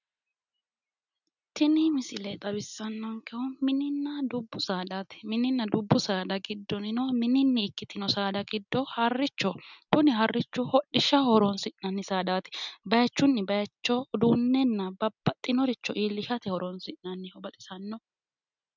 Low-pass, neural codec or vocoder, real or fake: 7.2 kHz; none; real